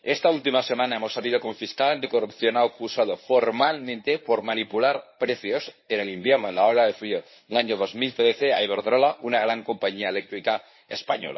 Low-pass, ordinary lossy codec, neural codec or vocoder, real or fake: 7.2 kHz; MP3, 24 kbps; codec, 24 kHz, 0.9 kbps, WavTokenizer, medium speech release version 1; fake